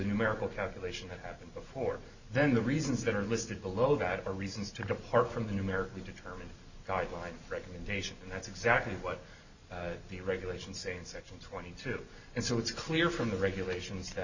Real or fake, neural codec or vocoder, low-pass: real; none; 7.2 kHz